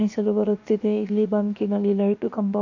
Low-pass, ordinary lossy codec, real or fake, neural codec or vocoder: 7.2 kHz; AAC, 48 kbps; fake; codec, 16 kHz, 0.7 kbps, FocalCodec